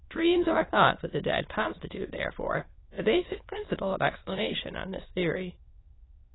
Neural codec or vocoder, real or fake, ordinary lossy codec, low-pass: autoencoder, 22.05 kHz, a latent of 192 numbers a frame, VITS, trained on many speakers; fake; AAC, 16 kbps; 7.2 kHz